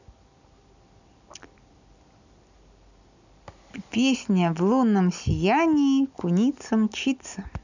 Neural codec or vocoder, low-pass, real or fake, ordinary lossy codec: none; 7.2 kHz; real; none